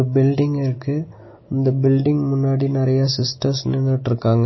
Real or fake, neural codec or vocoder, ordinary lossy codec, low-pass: real; none; MP3, 24 kbps; 7.2 kHz